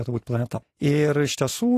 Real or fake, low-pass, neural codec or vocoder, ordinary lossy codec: real; 14.4 kHz; none; AAC, 48 kbps